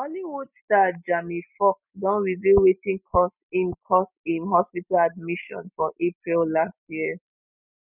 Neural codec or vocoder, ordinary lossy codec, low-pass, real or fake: none; none; 3.6 kHz; real